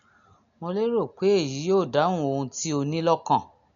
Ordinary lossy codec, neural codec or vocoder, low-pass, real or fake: none; none; 7.2 kHz; real